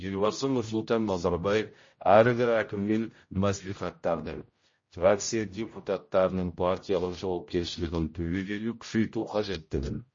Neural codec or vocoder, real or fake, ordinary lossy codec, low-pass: codec, 16 kHz, 0.5 kbps, X-Codec, HuBERT features, trained on general audio; fake; MP3, 32 kbps; 7.2 kHz